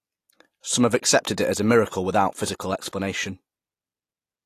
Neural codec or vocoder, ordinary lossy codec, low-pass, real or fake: none; AAC, 48 kbps; 14.4 kHz; real